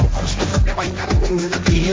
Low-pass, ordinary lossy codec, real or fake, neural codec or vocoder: none; none; fake; codec, 16 kHz, 1.1 kbps, Voila-Tokenizer